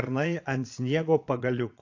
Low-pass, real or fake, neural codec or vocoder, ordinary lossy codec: 7.2 kHz; fake; vocoder, 22.05 kHz, 80 mel bands, Vocos; AAC, 48 kbps